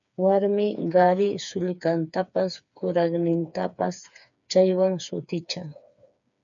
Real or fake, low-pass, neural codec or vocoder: fake; 7.2 kHz; codec, 16 kHz, 4 kbps, FreqCodec, smaller model